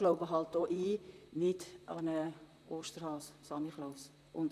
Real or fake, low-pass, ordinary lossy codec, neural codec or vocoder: fake; 14.4 kHz; none; vocoder, 44.1 kHz, 128 mel bands, Pupu-Vocoder